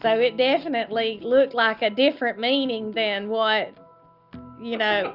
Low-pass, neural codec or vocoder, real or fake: 5.4 kHz; none; real